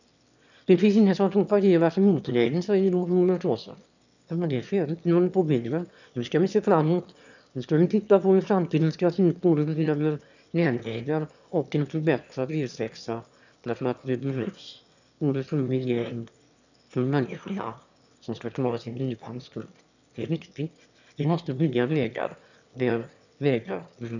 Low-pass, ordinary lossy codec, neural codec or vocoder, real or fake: 7.2 kHz; none; autoencoder, 22.05 kHz, a latent of 192 numbers a frame, VITS, trained on one speaker; fake